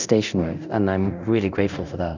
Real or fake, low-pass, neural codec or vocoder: fake; 7.2 kHz; codec, 24 kHz, 0.9 kbps, DualCodec